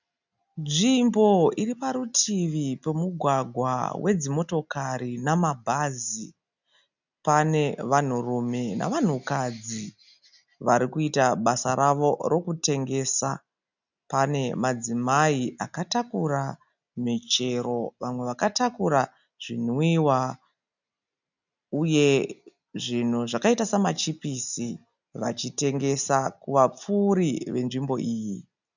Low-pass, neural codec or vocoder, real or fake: 7.2 kHz; none; real